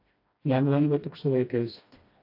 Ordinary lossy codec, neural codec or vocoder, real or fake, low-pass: AAC, 32 kbps; codec, 16 kHz, 1 kbps, FreqCodec, smaller model; fake; 5.4 kHz